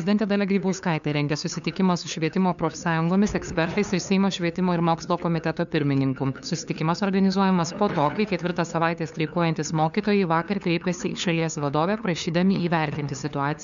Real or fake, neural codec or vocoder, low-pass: fake; codec, 16 kHz, 2 kbps, FunCodec, trained on LibriTTS, 25 frames a second; 7.2 kHz